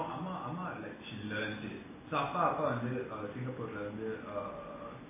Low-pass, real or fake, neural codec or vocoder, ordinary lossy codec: 3.6 kHz; real; none; MP3, 16 kbps